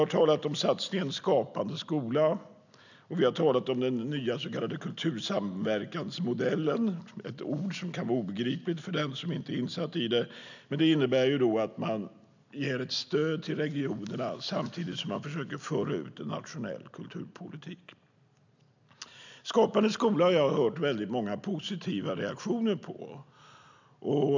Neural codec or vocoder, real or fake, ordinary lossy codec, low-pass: none; real; none; 7.2 kHz